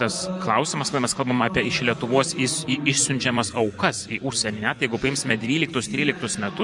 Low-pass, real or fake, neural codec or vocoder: 10.8 kHz; fake; vocoder, 24 kHz, 100 mel bands, Vocos